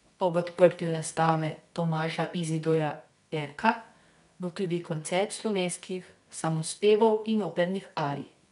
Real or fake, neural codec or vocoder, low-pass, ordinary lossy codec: fake; codec, 24 kHz, 0.9 kbps, WavTokenizer, medium music audio release; 10.8 kHz; none